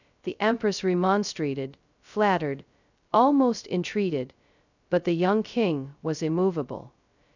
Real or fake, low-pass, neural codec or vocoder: fake; 7.2 kHz; codec, 16 kHz, 0.2 kbps, FocalCodec